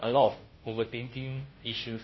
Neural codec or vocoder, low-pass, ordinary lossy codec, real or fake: codec, 16 kHz, 0.5 kbps, FunCodec, trained on Chinese and English, 25 frames a second; 7.2 kHz; MP3, 24 kbps; fake